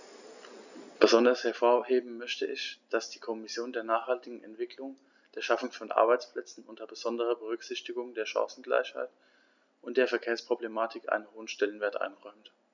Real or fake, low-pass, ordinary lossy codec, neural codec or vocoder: real; 7.2 kHz; none; none